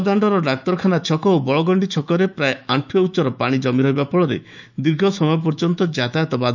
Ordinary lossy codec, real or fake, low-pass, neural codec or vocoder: none; fake; 7.2 kHz; autoencoder, 48 kHz, 128 numbers a frame, DAC-VAE, trained on Japanese speech